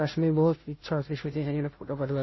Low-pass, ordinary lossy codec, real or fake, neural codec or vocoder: 7.2 kHz; MP3, 24 kbps; fake; codec, 16 kHz, 0.5 kbps, FunCodec, trained on Chinese and English, 25 frames a second